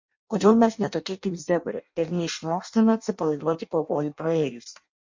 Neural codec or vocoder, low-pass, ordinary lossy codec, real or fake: codec, 16 kHz in and 24 kHz out, 0.6 kbps, FireRedTTS-2 codec; 7.2 kHz; MP3, 48 kbps; fake